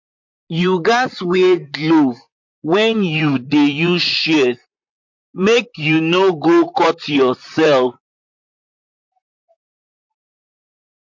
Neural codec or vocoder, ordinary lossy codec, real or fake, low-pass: vocoder, 44.1 kHz, 128 mel bands, Pupu-Vocoder; MP3, 48 kbps; fake; 7.2 kHz